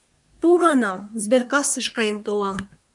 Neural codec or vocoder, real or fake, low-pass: codec, 24 kHz, 1 kbps, SNAC; fake; 10.8 kHz